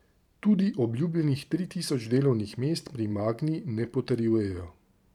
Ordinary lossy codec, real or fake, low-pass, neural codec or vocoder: none; fake; 19.8 kHz; vocoder, 44.1 kHz, 128 mel bands every 512 samples, BigVGAN v2